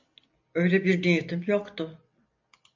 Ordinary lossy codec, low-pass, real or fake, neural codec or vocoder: MP3, 48 kbps; 7.2 kHz; real; none